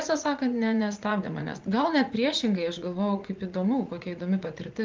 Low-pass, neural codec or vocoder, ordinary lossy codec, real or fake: 7.2 kHz; vocoder, 44.1 kHz, 80 mel bands, Vocos; Opus, 24 kbps; fake